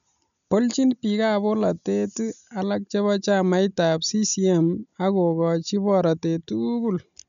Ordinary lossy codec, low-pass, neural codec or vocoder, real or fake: none; 7.2 kHz; none; real